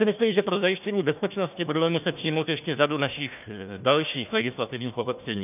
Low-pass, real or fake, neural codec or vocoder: 3.6 kHz; fake; codec, 16 kHz, 1 kbps, FunCodec, trained on Chinese and English, 50 frames a second